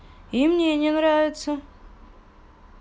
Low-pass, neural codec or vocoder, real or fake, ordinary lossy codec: none; none; real; none